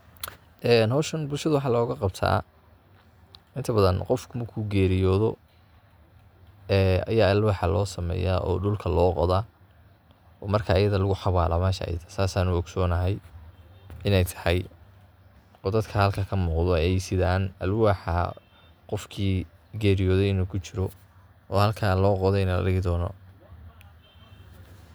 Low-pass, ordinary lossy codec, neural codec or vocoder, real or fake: none; none; none; real